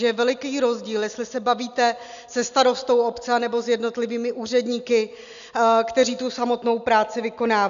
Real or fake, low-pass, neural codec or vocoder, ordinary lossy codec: real; 7.2 kHz; none; MP3, 96 kbps